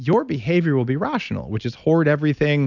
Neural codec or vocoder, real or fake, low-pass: none; real; 7.2 kHz